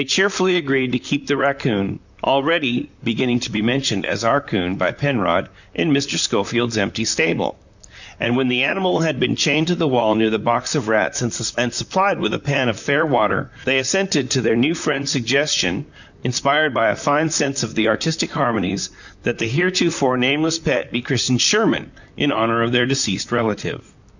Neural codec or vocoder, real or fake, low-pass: vocoder, 44.1 kHz, 128 mel bands, Pupu-Vocoder; fake; 7.2 kHz